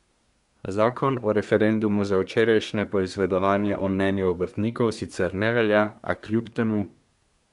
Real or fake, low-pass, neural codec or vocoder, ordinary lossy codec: fake; 10.8 kHz; codec, 24 kHz, 1 kbps, SNAC; none